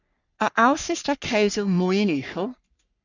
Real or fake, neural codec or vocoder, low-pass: fake; codec, 44.1 kHz, 3.4 kbps, Pupu-Codec; 7.2 kHz